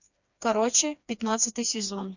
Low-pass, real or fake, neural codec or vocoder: 7.2 kHz; fake; codec, 16 kHz, 2 kbps, FreqCodec, smaller model